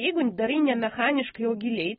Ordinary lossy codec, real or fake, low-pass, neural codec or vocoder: AAC, 16 kbps; real; 7.2 kHz; none